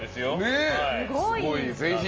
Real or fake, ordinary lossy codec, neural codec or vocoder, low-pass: real; Opus, 24 kbps; none; 7.2 kHz